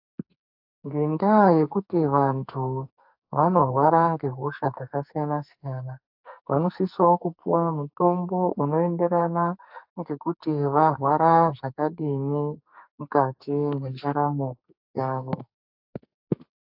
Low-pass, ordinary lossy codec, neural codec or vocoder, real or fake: 5.4 kHz; AAC, 48 kbps; codec, 44.1 kHz, 2.6 kbps, SNAC; fake